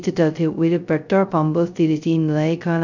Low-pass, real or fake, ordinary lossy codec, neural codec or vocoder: 7.2 kHz; fake; none; codec, 16 kHz, 0.2 kbps, FocalCodec